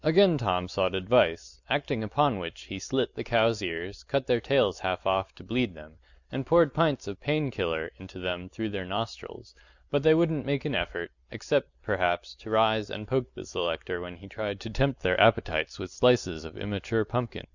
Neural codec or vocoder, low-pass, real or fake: none; 7.2 kHz; real